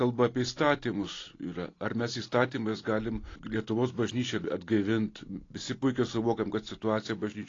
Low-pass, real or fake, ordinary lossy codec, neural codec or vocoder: 7.2 kHz; real; AAC, 32 kbps; none